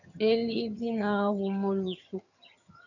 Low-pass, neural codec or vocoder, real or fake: 7.2 kHz; vocoder, 22.05 kHz, 80 mel bands, HiFi-GAN; fake